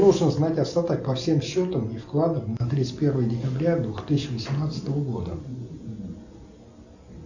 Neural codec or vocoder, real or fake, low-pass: none; real; 7.2 kHz